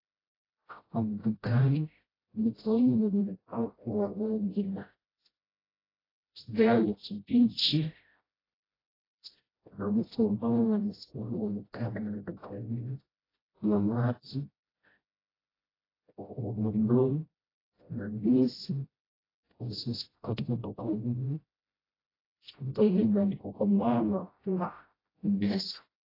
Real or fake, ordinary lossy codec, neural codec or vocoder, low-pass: fake; AAC, 24 kbps; codec, 16 kHz, 0.5 kbps, FreqCodec, smaller model; 5.4 kHz